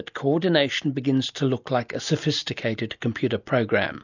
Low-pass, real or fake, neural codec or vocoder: 7.2 kHz; real; none